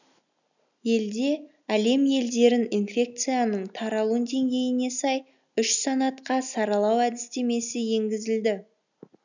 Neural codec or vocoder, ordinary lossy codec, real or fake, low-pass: none; none; real; 7.2 kHz